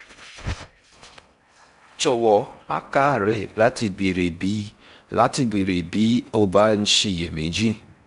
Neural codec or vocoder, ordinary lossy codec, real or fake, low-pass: codec, 16 kHz in and 24 kHz out, 0.6 kbps, FocalCodec, streaming, 4096 codes; none; fake; 10.8 kHz